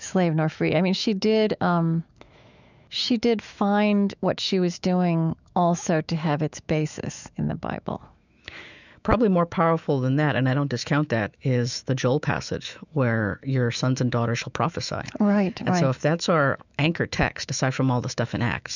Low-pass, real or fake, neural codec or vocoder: 7.2 kHz; fake; autoencoder, 48 kHz, 128 numbers a frame, DAC-VAE, trained on Japanese speech